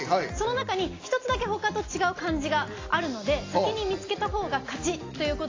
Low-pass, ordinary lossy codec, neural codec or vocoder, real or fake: 7.2 kHz; AAC, 32 kbps; none; real